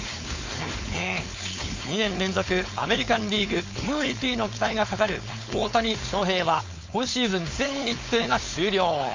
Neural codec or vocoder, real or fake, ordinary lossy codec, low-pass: codec, 16 kHz, 4.8 kbps, FACodec; fake; MP3, 48 kbps; 7.2 kHz